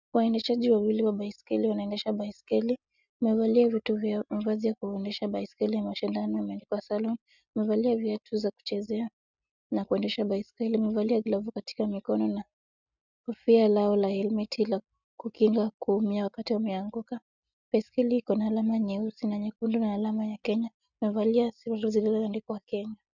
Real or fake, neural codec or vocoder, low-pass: real; none; 7.2 kHz